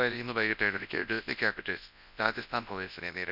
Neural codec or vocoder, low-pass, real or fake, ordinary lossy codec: codec, 24 kHz, 0.9 kbps, WavTokenizer, large speech release; 5.4 kHz; fake; none